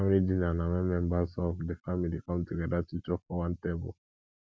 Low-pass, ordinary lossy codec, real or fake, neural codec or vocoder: none; none; real; none